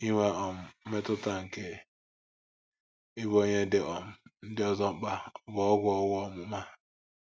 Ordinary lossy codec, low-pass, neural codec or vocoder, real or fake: none; none; none; real